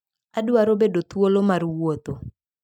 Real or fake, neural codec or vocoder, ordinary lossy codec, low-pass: real; none; MP3, 96 kbps; 19.8 kHz